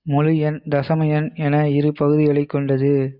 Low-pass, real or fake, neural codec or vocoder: 5.4 kHz; real; none